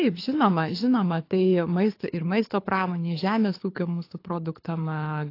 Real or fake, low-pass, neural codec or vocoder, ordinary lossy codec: fake; 5.4 kHz; codec, 24 kHz, 6 kbps, HILCodec; AAC, 32 kbps